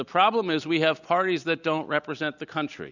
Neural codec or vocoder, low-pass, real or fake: none; 7.2 kHz; real